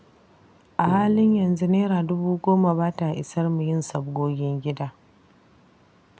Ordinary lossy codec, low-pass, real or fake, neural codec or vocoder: none; none; real; none